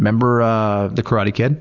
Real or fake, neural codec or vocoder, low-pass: real; none; 7.2 kHz